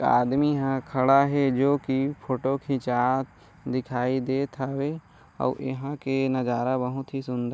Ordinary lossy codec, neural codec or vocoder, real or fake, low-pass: none; none; real; none